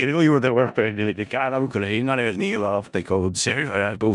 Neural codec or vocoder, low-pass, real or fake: codec, 16 kHz in and 24 kHz out, 0.4 kbps, LongCat-Audio-Codec, four codebook decoder; 10.8 kHz; fake